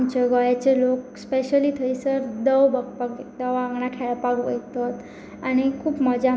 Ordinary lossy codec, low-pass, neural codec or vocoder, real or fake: none; none; none; real